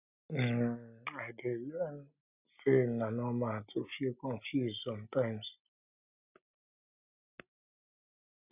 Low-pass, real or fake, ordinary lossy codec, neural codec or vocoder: 3.6 kHz; real; none; none